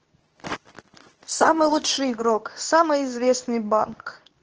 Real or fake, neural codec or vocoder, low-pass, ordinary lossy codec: fake; codec, 24 kHz, 0.9 kbps, WavTokenizer, medium speech release version 2; 7.2 kHz; Opus, 16 kbps